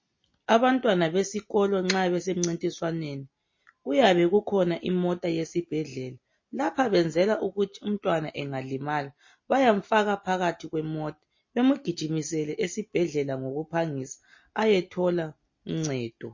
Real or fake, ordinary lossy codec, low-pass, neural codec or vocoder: real; MP3, 32 kbps; 7.2 kHz; none